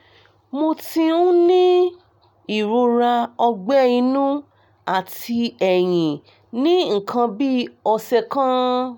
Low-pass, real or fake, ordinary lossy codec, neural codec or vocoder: 19.8 kHz; real; none; none